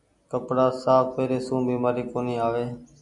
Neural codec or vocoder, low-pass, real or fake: none; 10.8 kHz; real